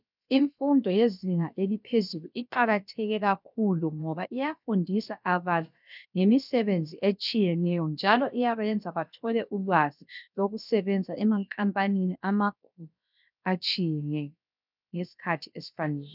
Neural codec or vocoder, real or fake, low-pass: codec, 16 kHz, about 1 kbps, DyCAST, with the encoder's durations; fake; 5.4 kHz